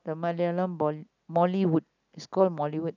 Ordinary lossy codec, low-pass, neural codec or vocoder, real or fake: none; 7.2 kHz; none; real